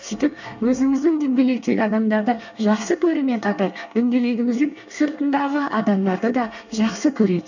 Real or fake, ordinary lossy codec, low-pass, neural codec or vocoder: fake; none; 7.2 kHz; codec, 24 kHz, 1 kbps, SNAC